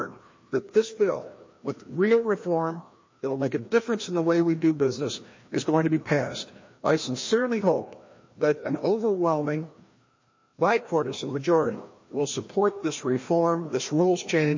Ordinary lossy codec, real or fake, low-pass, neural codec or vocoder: MP3, 32 kbps; fake; 7.2 kHz; codec, 16 kHz, 1 kbps, FreqCodec, larger model